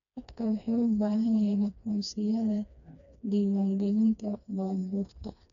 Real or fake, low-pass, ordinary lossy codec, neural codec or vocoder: fake; 7.2 kHz; none; codec, 16 kHz, 2 kbps, FreqCodec, smaller model